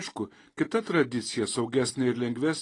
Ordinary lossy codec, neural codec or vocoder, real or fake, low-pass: AAC, 32 kbps; none; real; 10.8 kHz